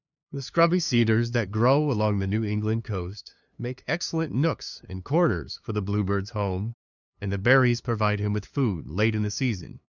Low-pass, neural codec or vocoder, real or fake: 7.2 kHz; codec, 16 kHz, 2 kbps, FunCodec, trained on LibriTTS, 25 frames a second; fake